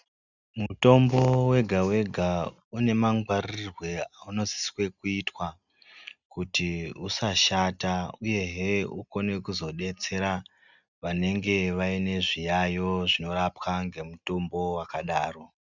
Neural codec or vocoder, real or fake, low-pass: none; real; 7.2 kHz